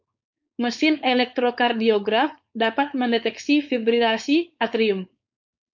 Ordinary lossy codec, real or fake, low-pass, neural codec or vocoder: MP3, 64 kbps; fake; 7.2 kHz; codec, 16 kHz, 4.8 kbps, FACodec